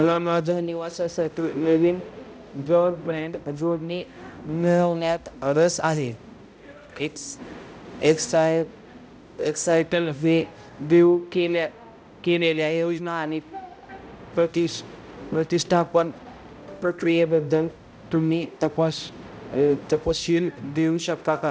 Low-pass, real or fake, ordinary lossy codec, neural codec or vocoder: none; fake; none; codec, 16 kHz, 0.5 kbps, X-Codec, HuBERT features, trained on balanced general audio